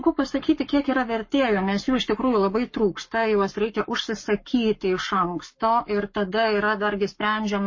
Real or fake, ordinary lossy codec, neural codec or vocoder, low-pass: fake; MP3, 32 kbps; codec, 44.1 kHz, 7.8 kbps, Pupu-Codec; 7.2 kHz